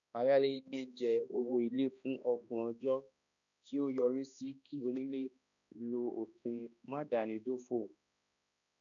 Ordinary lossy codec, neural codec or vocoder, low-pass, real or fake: none; codec, 16 kHz, 1 kbps, X-Codec, HuBERT features, trained on balanced general audio; 7.2 kHz; fake